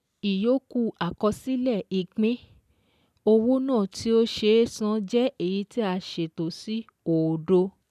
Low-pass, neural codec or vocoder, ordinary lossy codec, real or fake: 14.4 kHz; none; none; real